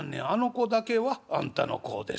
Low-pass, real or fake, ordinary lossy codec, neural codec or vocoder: none; real; none; none